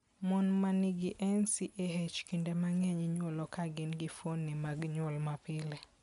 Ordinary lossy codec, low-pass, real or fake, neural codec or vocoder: none; 10.8 kHz; real; none